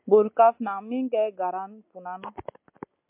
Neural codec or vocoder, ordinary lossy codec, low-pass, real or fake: none; MP3, 32 kbps; 3.6 kHz; real